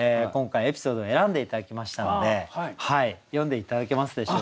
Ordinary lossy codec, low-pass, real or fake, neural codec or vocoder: none; none; real; none